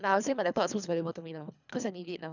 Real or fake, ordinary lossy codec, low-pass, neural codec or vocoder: fake; none; 7.2 kHz; codec, 24 kHz, 3 kbps, HILCodec